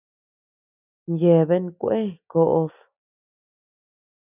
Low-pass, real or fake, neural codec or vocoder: 3.6 kHz; real; none